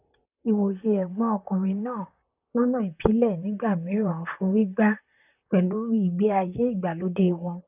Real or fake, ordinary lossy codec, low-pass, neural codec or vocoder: fake; none; 3.6 kHz; vocoder, 44.1 kHz, 128 mel bands, Pupu-Vocoder